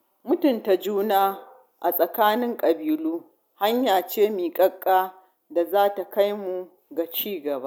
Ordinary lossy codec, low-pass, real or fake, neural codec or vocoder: none; none; real; none